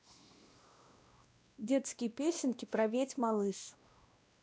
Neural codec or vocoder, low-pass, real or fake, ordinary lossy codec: codec, 16 kHz, 1 kbps, X-Codec, WavLM features, trained on Multilingual LibriSpeech; none; fake; none